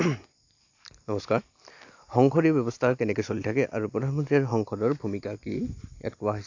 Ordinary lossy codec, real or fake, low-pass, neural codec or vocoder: AAC, 48 kbps; real; 7.2 kHz; none